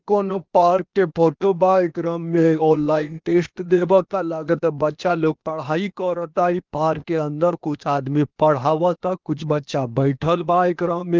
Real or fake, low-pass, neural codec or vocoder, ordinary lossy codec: fake; 7.2 kHz; codec, 16 kHz, 0.8 kbps, ZipCodec; Opus, 32 kbps